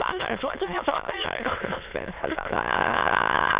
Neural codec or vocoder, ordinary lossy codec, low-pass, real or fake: autoencoder, 22.05 kHz, a latent of 192 numbers a frame, VITS, trained on many speakers; Opus, 64 kbps; 3.6 kHz; fake